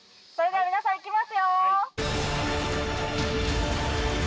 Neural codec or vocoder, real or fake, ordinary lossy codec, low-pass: none; real; none; none